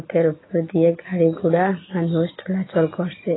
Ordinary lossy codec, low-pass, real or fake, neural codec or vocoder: AAC, 16 kbps; 7.2 kHz; real; none